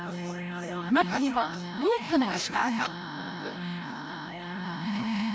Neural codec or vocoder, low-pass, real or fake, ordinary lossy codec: codec, 16 kHz, 0.5 kbps, FreqCodec, larger model; none; fake; none